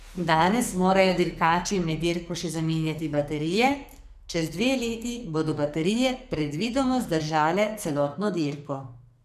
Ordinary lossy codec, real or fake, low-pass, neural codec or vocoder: none; fake; 14.4 kHz; codec, 44.1 kHz, 2.6 kbps, SNAC